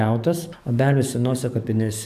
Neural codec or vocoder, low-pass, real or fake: codec, 44.1 kHz, 7.8 kbps, DAC; 14.4 kHz; fake